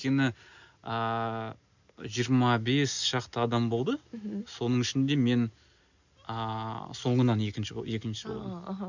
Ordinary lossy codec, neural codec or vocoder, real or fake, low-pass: none; none; real; 7.2 kHz